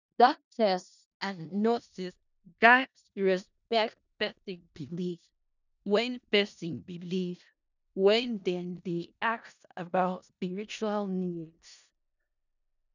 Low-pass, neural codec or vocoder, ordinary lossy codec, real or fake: 7.2 kHz; codec, 16 kHz in and 24 kHz out, 0.4 kbps, LongCat-Audio-Codec, four codebook decoder; none; fake